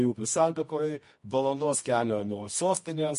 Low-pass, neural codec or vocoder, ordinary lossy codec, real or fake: 10.8 kHz; codec, 24 kHz, 0.9 kbps, WavTokenizer, medium music audio release; MP3, 48 kbps; fake